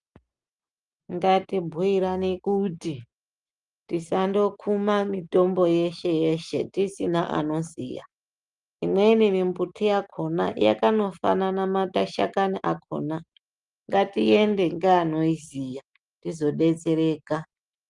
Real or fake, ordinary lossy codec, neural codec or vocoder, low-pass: real; Opus, 32 kbps; none; 10.8 kHz